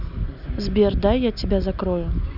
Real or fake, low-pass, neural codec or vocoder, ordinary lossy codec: real; 5.4 kHz; none; none